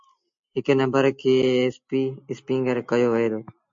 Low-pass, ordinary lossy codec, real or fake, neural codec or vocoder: 7.2 kHz; MP3, 48 kbps; real; none